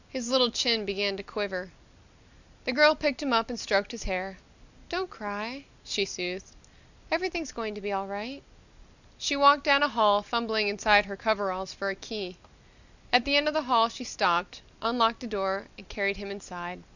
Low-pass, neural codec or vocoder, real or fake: 7.2 kHz; none; real